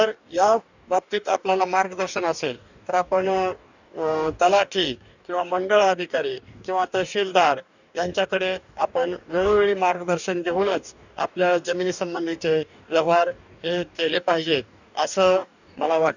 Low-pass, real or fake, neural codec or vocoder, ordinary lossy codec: 7.2 kHz; fake; codec, 44.1 kHz, 2.6 kbps, DAC; none